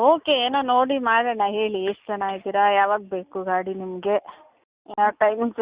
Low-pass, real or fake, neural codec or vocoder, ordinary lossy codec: 3.6 kHz; real; none; Opus, 64 kbps